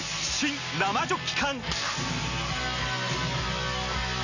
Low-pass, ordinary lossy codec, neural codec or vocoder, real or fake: 7.2 kHz; none; none; real